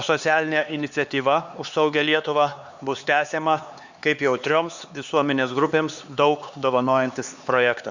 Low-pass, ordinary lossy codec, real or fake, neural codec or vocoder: 7.2 kHz; Opus, 64 kbps; fake; codec, 16 kHz, 4 kbps, X-Codec, HuBERT features, trained on LibriSpeech